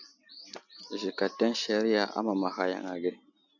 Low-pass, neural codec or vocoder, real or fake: 7.2 kHz; none; real